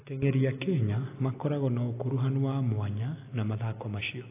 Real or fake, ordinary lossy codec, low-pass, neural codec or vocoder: real; AAC, 24 kbps; 3.6 kHz; none